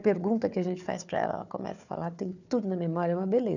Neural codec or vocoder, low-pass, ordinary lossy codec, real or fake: codec, 16 kHz, 4 kbps, FunCodec, trained on Chinese and English, 50 frames a second; 7.2 kHz; none; fake